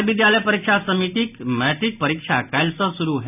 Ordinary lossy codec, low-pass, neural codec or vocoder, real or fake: none; 3.6 kHz; none; real